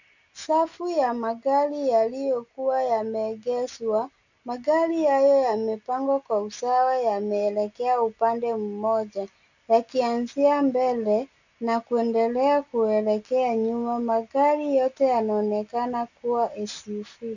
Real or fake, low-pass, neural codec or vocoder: real; 7.2 kHz; none